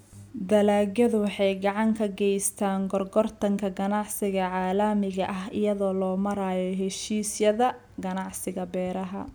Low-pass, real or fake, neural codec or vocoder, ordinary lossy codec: none; real; none; none